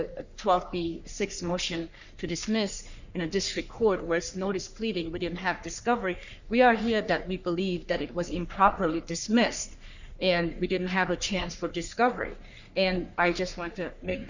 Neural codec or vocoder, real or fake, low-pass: codec, 44.1 kHz, 3.4 kbps, Pupu-Codec; fake; 7.2 kHz